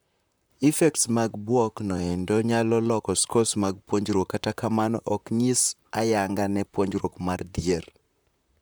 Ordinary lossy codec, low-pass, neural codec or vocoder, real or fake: none; none; vocoder, 44.1 kHz, 128 mel bands, Pupu-Vocoder; fake